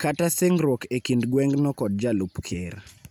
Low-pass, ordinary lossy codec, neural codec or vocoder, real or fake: none; none; none; real